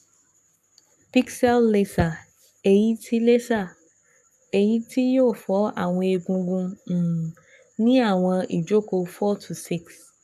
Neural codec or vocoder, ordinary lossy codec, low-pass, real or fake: codec, 44.1 kHz, 7.8 kbps, Pupu-Codec; none; 14.4 kHz; fake